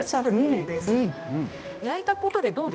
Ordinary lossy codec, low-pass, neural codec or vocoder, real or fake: none; none; codec, 16 kHz, 1 kbps, X-Codec, HuBERT features, trained on balanced general audio; fake